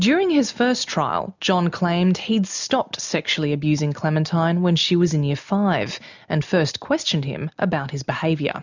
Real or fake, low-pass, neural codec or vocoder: real; 7.2 kHz; none